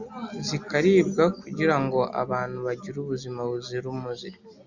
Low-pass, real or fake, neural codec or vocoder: 7.2 kHz; real; none